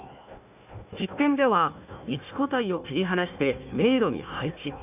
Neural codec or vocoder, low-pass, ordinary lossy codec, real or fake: codec, 16 kHz, 1 kbps, FunCodec, trained on Chinese and English, 50 frames a second; 3.6 kHz; none; fake